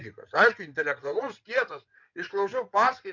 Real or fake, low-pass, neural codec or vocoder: fake; 7.2 kHz; codec, 16 kHz in and 24 kHz out, 2.2 kbps, FireRedTTS-2 codec